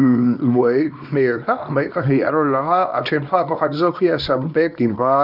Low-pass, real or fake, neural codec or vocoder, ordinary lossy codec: 5.4 kHz; fake; codec, 24 kHz, 0.9 kbps, WavTokenizer, small release; none